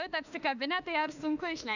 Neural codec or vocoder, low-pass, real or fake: autoencoder, 48 kHz, 32 numbers a frame, DAC-VAE, trained on Japanese speech; 7.2 kHz; fake